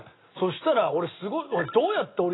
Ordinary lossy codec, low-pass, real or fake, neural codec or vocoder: AAC, 16 kbps; 7.2 kHz; real; none